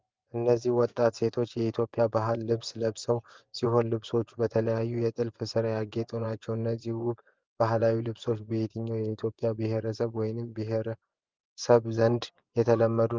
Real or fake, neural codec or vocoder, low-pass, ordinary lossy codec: fake; vocoder, 24 kHz, 100 mel bands, Vocos; 7.2 kHz; Opus, 32 kbps